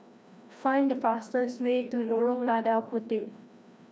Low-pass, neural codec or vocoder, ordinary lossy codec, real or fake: none; codec, 16 kHz, 1 kbps, FreqCodec, larger model; none; fake